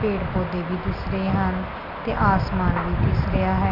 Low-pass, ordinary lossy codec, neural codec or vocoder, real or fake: 5.4 kHz; none; none; real